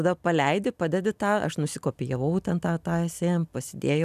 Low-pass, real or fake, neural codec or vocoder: 14.4 kHz; real; none